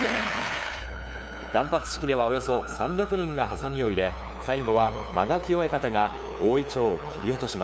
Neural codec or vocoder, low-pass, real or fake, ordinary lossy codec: codec, 16 kHz, 2 kbps, FunCodec, trained on LibriTTS, 25 frames a second; none; fake; none